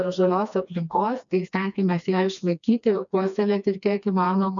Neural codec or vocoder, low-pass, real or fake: codec, 16 kHz, 2 kbps, FreqCodec, smaller model; 7.2 kHz; fake